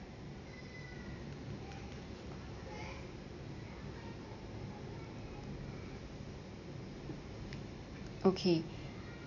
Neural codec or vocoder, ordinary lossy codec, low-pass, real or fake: none; none; 7.2 kHz; real